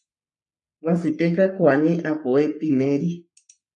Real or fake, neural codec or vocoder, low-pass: fake; codec, 44.1 kHz, 3.4 kbps, Pupu-Codec; 10.8 kHz